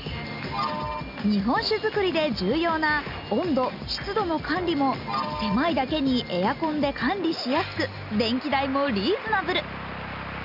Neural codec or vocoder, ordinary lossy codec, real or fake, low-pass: none; none; real; 5.4 kHz